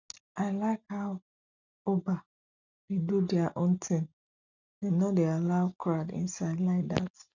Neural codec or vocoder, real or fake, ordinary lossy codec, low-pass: none; real; none; 7.2 kHz